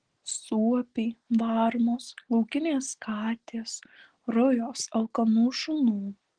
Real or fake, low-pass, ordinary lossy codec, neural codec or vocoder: real; 9.9 kHz; Opus, 16 kbps; none